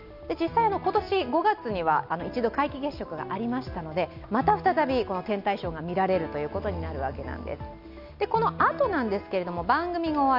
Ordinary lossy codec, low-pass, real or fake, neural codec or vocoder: none; 5.4 kHz; real; none